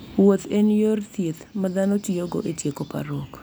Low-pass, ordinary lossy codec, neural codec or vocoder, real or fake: none; none; none; real